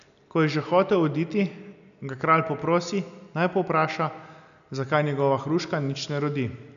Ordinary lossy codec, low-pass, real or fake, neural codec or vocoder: none; 7.2 kHz; real; none